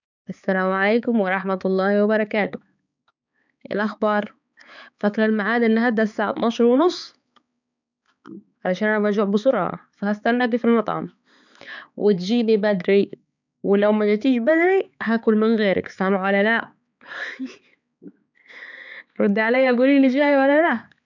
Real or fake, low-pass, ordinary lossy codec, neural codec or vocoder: fake; 7.2 kHz; none; codec, 16 kHz, 4 kbps, X-Codec, HuBERT features, trained on balanced general audio